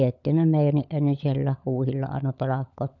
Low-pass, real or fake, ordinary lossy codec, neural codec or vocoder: 7.2 kHz; fake; none; codec, 16 kHz, 16 kbps, FunCodec, trained on LibriTTS, 50 frames a second